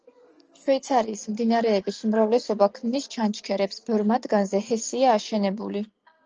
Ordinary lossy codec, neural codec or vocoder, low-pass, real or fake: Opus, 24 kbps; none; 7.2 kHz; real